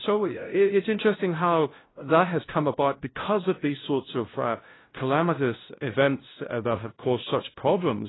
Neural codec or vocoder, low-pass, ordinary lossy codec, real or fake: codec, 16 kHz, 0.5 kbps, FunCodec, trained on LibriTTS, 25 frames a second; 7.2 kHz; AAC, 16 kbps; fake